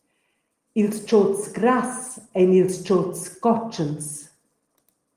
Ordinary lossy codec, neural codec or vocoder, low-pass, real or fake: Opus, 24 kbps; none; 14.4 kHz; real